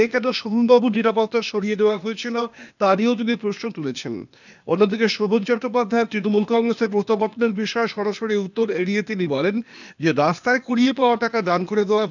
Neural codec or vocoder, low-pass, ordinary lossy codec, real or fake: codec, 16 kHz, 0.8 kbps, ZipCodec; 7.2 kHz; none; fake